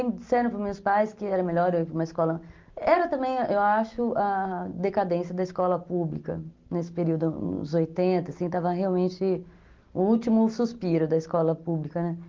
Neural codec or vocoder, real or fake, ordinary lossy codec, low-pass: none; real; Opus, 32 kbps; 7.2 kHz